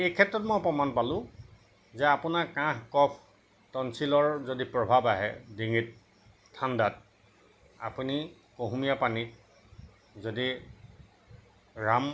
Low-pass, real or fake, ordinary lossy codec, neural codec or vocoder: none; real; none; none